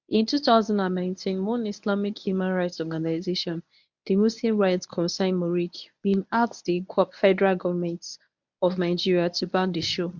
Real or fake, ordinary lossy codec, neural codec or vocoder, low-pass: fake; MP3, 64 kbps; codec, 24 kHz, 0.9 kbps, WavTokenizer, medium speech release version 1; 7.2 kHz